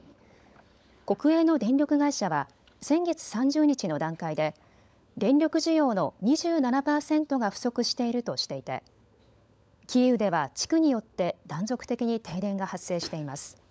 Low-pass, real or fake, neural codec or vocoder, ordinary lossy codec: none; fake; codec, 16 kHz, 16 kbps, FunCodec, trained on LibriTTS, 50 frames a second; none